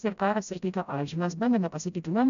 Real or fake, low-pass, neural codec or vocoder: fake; 7.2 kHz; codec, 16 kHz, 0.5 kbps, FreqCodec, smaller model